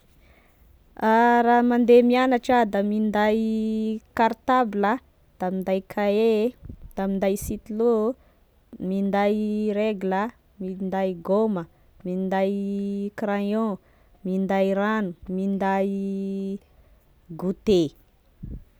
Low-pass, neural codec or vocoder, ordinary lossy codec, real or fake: none; none; none; real